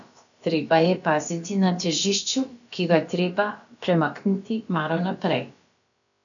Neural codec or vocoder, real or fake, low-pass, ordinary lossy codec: codec, 16 kHz, about 1 kbps, DyCAST, with the encoder's durations; fake; 7.2 kHz; none